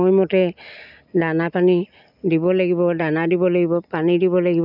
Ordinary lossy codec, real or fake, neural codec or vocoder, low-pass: none; real; none; 5.4 kHz